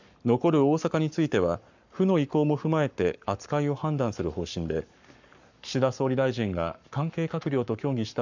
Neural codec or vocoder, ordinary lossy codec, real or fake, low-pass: codec, 44.1 kHz, 7.8 kbps, Pupu-Codec; none; fake; 7.2 kHz